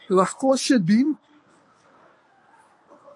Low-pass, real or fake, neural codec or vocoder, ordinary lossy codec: 10.8 kHz; fake; codec, 24 kHz, 0.9 kbps, WavTokenizer, medium speech release version 1; AAC, 64 kbps